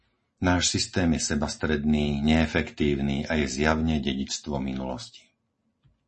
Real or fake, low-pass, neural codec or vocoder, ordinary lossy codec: real; 10.8 kHz; none; MP3, 32 kbps